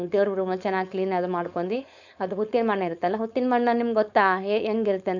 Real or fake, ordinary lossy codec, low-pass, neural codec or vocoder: fake; none; 7.2 kHz; codec, 16 kHz, 4.8 kbps, FACodec